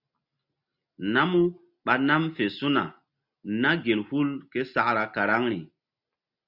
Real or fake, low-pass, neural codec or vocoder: real; 5.4 kHz; none